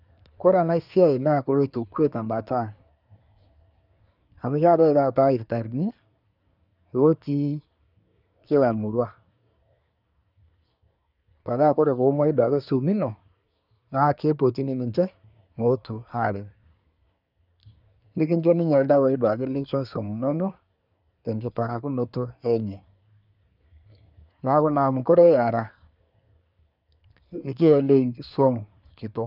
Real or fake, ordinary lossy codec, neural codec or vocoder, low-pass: fake; none; codec, 24 kHz, 1 kbps, SNAC; 5.4 kHz